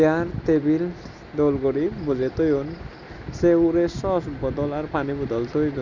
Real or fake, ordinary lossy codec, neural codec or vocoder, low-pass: real; none; none; 7.2 kHz